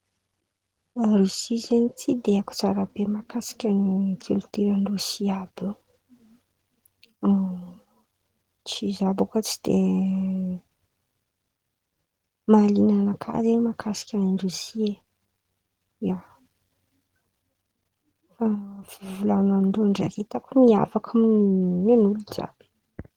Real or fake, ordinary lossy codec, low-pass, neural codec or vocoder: real; Opus, 16 kbps; 19.8 kHz; none